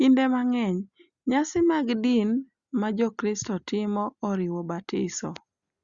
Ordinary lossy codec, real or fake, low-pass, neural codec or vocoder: Opus, 64 kbps; real; 7.2 kHz; none